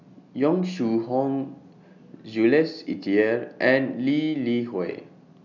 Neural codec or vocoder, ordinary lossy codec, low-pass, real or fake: none; none; 7.2 kHz; real